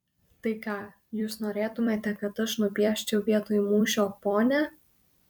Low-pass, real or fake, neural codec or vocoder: 19.8 kHz; fake; vocoder, 44.1 kHz, 128 mel bands every 256 samples, BigVGAN v2